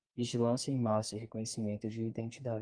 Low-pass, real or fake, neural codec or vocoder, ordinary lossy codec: 9.9 kHz; fake; codec, 32 kHz, 1.9 kbps, SNAC; Opus, 32 kbps